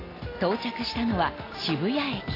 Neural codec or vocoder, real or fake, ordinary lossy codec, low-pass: none; real; AAC, 24 kbps; 5.4 kHz